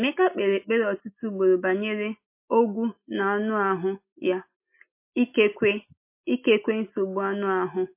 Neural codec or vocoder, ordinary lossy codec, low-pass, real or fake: none; MP3, 24 kbps; 3.6 kHz; real